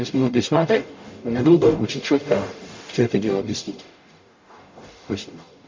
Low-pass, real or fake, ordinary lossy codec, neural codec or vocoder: 7.2 kHz; fake; MP3, 48 kbps; codec, 44.1 kHz, 0.9 kbps, DAC